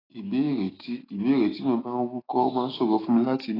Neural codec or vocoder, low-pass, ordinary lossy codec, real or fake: autoencoder, 48 kHz, 128 numbers a frame, DAC-VAE, trained on Japanese speech; 5.4 kHz; AAC, 24 kbps; fake